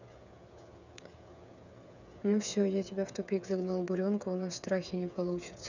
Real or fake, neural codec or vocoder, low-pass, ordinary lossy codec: fake; codec, 16 kHz, 8 kbps, FreqCodec, smaller model; 7.2 kHz; none